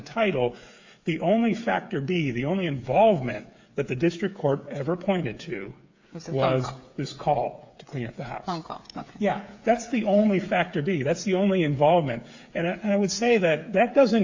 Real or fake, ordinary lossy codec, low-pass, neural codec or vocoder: fake; AAC, 48 kbps; 7.2 kHz; codec, 16 kHz, 8 kbps, FreqCodec, smaller model